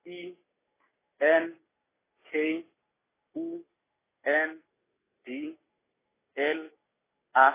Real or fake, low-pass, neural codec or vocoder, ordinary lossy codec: real; 3.6 kHz; none; AAC, 16 kbps